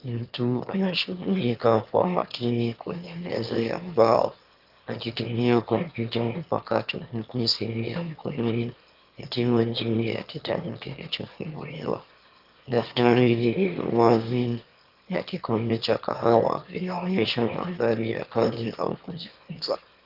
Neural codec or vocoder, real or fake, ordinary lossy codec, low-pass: autoencoder, 22.05 kHz, a latent of 192 numbers a frame, VITS, trained on one speaker; fake; Opus, 32 kbps; 5.4 kHz